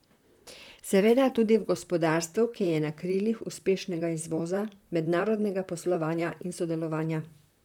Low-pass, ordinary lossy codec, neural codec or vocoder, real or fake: 19.8 kHz; none; vocoder, 44.1 kHz, 128 mel bands, Pupu-Vocoder; fake